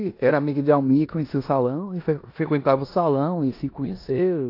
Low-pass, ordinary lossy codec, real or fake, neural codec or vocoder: 5.4 kHz; AAC, 32 kbps; fake; codec, 16 kHz in and 24 kHz out, 0.9 kbps, LongCat-Audio-Codec, four codebook decoder